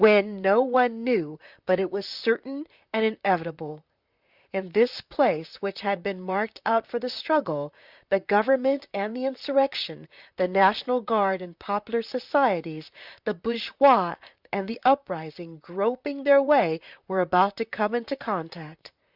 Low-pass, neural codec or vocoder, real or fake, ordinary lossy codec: 5.4 kHz; vocoder, 44.1 kHz, 128 mel bands, Pupu-Vocoder; fake; Opus, 64 kbps